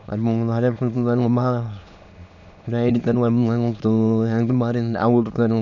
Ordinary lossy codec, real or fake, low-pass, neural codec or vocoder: none; fake; 7.2 kHz; autoencoder, 22.05 kHz, a latent of 192 numbers a frame, VITS, trained on many speakers